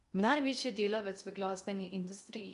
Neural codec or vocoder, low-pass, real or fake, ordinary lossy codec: codec, 16 kHz in and 24 kHz out, 0.6 kbps, FocalCodec, streaming, 2048 codes; 10.8 kHz; fake; none